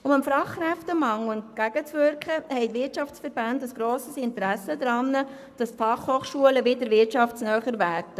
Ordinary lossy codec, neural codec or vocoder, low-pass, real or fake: none; codec, 44.1 kHz, 7.8 kbps, Pupu-Codec; 14.4 kHz; fake